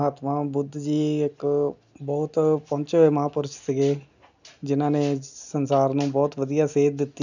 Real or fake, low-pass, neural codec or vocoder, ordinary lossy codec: real; 7.2 kHz; none; none